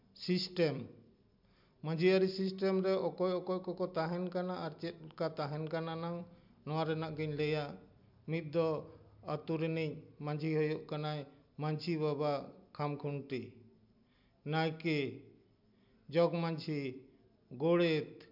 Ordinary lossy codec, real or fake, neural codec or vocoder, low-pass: MP3, 48 kbps; real; none; 5.4 kHz